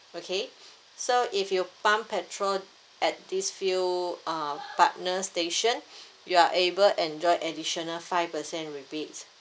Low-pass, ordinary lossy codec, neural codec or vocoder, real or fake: none; none; none; real